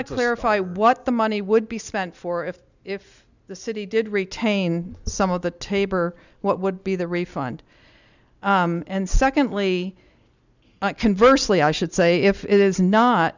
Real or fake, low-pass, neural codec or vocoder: real; 7.2 kHz; none